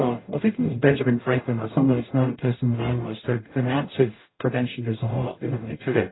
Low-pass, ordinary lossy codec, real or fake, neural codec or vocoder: 7.2 kHz; AAC, 16 kbps; fake; codec, 44.1 kHz, 0.9 kbps, DAC